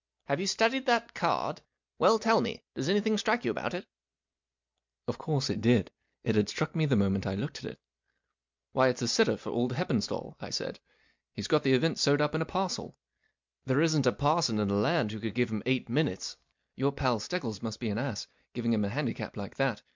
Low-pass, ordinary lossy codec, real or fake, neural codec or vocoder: 7.2 kHz; MP3, 64 kbps; real; none